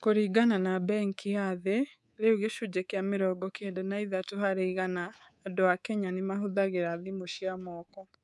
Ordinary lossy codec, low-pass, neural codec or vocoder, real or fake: none; none; codec, 24 kHz, 3.1 kbps, DualCodec; fake